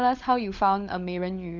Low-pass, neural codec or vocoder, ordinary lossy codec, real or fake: 7.2 kHz; codec, 16 kHz, 16 kbps, FunCodec, trained on LibriTTS, 50 frames a second; none; fake